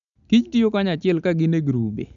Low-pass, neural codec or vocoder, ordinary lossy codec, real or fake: 7.2 kHz; none; MP3, 96 kbps; real